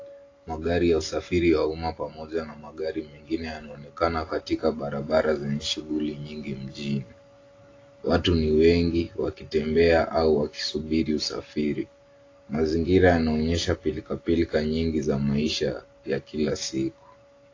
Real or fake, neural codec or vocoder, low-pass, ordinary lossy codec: real; none; 7.2 kHz; AAC, 32 kbps